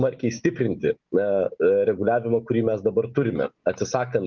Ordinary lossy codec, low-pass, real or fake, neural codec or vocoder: Opus, 24 kbps; 7.2 kHz; real; none